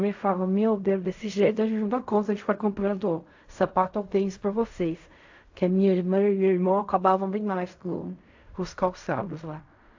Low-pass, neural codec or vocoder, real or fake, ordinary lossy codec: 7.2 kHz; codec, 16 kHz in and 24 kHz out, 0.4 kbps, LongCat-Audio-Codec, fine tuned four codebook decoder; fake; AAC, 48 kbps